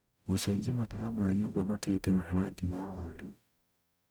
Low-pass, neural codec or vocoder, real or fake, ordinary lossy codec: none; codec, 44.1 kHz, 0.9 kbps, DAC; fake; none